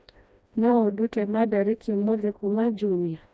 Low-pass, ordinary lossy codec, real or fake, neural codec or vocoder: none; none; fake; codec, 16 kHz, 1 kbps, FreqCodec, smaller model